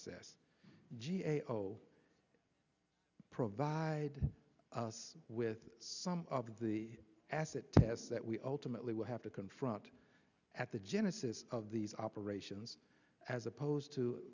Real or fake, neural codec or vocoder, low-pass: real; none; 7.2 kHz